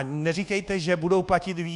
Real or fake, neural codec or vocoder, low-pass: fake; codec, 24 kHz, 1.2 kbps, DualCodec; 10.8 kHz